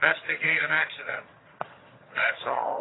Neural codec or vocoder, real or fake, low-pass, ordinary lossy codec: vocoder, 22.05 kHz, 80 mel bands, HiFi-GAN; fake; 7.2 kHz; AAC, 16 kbps